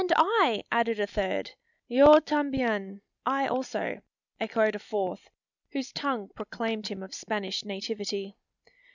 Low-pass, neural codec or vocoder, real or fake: 7.2 kHz; none; real